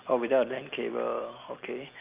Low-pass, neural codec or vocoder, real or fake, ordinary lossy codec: 3.6 kHz; none; real; Opus, 24 kbps